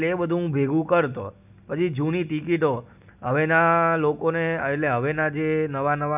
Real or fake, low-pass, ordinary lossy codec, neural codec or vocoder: real; 3.6 kHz; none; none